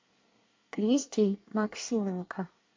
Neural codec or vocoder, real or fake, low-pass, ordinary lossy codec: codec, 24 kHz, 1 kbps, SNAC; fake; 7.2 kHz; MP3, 48 kbps